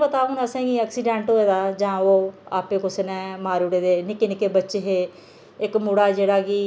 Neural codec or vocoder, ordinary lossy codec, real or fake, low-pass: none; none; real; none